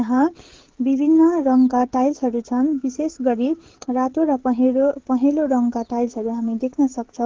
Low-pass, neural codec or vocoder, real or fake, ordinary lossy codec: 7.2 kHz; codec, 16 kHz, 16 kbps, FreqCodec, smaller model; fake; Opus, 16 kbps